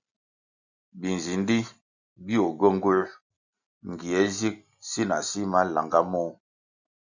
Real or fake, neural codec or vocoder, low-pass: real; none; 7.2 kHz